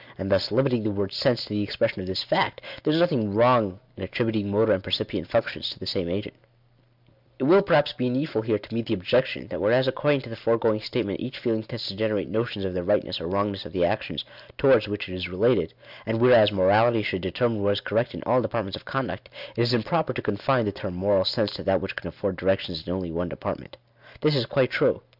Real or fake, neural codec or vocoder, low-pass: real; none; 5.4 kHz